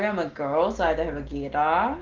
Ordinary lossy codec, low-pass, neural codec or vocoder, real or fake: Opus, 16 kbps; 7.2 kHz; none; real